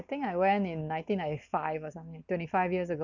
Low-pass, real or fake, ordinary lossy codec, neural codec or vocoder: 7.2 kHz; real; none; none